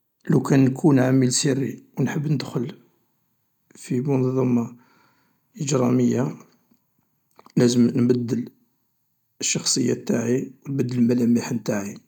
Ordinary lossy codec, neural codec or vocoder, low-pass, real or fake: none; none; 19.8 kHz; real